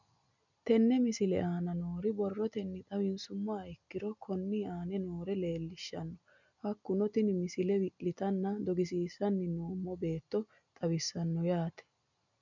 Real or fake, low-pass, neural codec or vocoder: real; 7.2 kHz; none